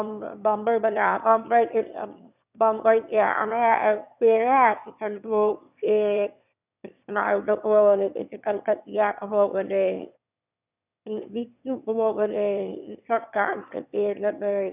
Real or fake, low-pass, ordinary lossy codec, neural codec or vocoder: fake; 3.6 kHz; none; autoencoder, 22.05 kHz, a latent of 192 numbers a frame, VITS, trained on one speaker